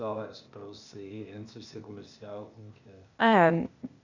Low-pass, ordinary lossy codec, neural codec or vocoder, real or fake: 7.2 kHz; none; codec, 16 kHz, 0.8 kbps, ZipCodec; fake